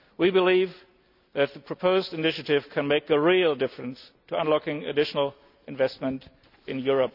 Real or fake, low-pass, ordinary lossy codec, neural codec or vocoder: real; 5.4 kHz; none; none